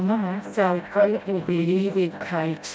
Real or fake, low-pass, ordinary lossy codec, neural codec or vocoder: fake; none; none; codec, 16 kHz, 0.5 kbps, FreqCodec, smaller model